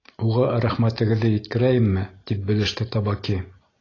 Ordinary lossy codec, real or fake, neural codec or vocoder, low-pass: AAC, 32 kbps; real; none; 7.2 kHz